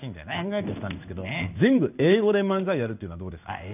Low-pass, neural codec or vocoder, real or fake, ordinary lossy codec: 3.6 kHz; codec, 16 kHz in and 24 kHz out, 1 kbps, XY-Tokenizer; fake; none